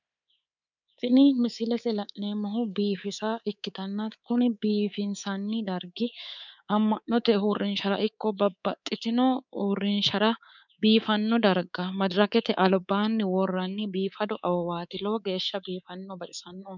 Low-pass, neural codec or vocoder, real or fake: 7.2 kHz; codec, 24 kHz, 3.1 kbps, DualCodec; fake